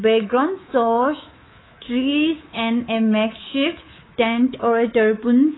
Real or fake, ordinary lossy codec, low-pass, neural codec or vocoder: fake; AAC, 16 kbps; 7.2 kHz; codec, 24 kHz, 3.1 kbps, DualCodec